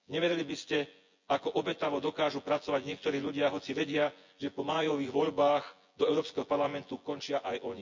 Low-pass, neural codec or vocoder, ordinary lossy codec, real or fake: 7.2 kHz; vocoder, 24 kHz, 100 mel bands, Vocos; MP3, 64 kbps; fake